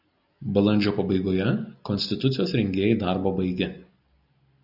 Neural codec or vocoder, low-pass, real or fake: none; 5.4 kHz; real